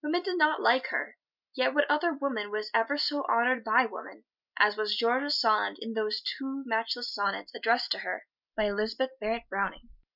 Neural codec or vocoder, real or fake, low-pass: none; real; 5.4 kHz